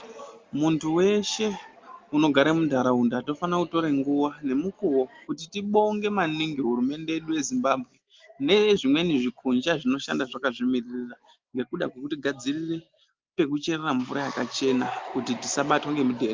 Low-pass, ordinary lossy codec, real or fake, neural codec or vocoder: 7.2 kHz; Opus, 32 kbps; real; none